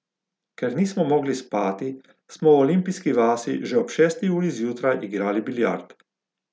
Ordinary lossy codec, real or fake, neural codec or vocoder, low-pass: none; real; none; none